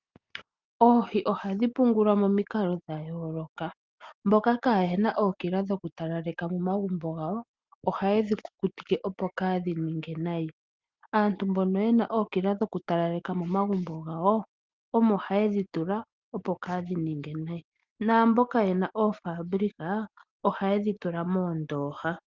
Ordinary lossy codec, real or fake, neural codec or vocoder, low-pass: Opus, 32 kbps; real; none; 7.2 kHz